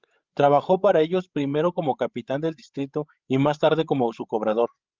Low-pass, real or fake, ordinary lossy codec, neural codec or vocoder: 7.2 kHz; fake; Opus, 24 kbps; codec, 16 kHz, 16 kbps, FreqCodec, larger model